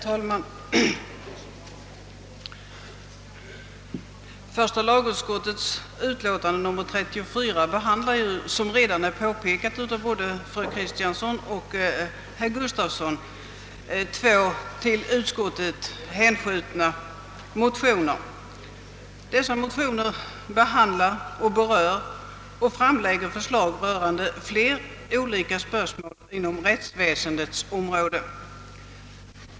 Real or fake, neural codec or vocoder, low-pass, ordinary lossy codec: real; none; none; none